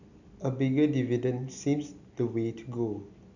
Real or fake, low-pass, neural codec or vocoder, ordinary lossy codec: real; 7.2 kHz; none; none